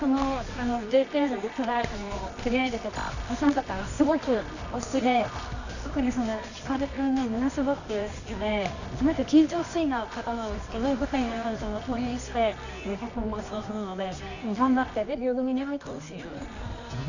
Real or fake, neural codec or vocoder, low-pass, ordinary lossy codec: fake; codec, 24 kHz, 0.9 kbps, WavTokenizer, medium music audio release; 7.2 kHz; none